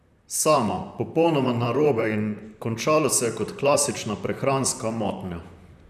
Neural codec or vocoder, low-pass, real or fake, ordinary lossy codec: vocoder, 44.1 kHz, 128 mel bands, Pupu-Vocoder; 14.4 kHz; fake; none